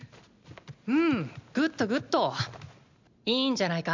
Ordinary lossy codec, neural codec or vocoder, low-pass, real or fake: MP3, 64 kbps; none; 7.2 kHz; real